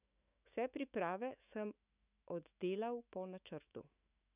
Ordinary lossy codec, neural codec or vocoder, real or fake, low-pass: none; autoencoder, 48 kHz, 128 numbers a frame, DAC-VAE, trained on Japanese speech; fake; 3.6 kHz